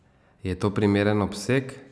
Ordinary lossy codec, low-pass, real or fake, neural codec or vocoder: none; none; real; none